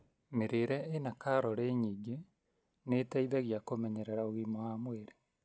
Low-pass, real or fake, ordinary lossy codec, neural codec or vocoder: none; real; none; none